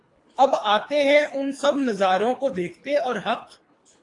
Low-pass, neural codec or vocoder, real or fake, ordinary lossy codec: 10.8 kHz; codec, 24 kHz, 3 kbps, HILCodec; fake; AAC, 48 kbps